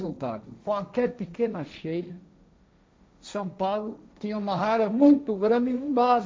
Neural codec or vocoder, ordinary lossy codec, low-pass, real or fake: codec, 16 kHz, 1.1 kbps, Voila-Tokenizer; none; none; fake